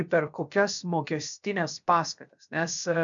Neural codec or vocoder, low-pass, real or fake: codec, 16 kHz, about 1 kbps, DyCAST, with the encoder's durations; 7.2 kHz; fake